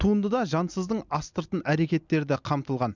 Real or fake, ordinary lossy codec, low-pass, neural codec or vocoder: real; none; 7.2 kHz; none